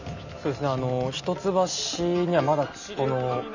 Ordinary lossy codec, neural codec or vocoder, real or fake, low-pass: none; none; real; 7.2 kHz